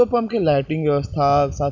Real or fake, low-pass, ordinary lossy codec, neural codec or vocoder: real; 7.2 kHz; none; none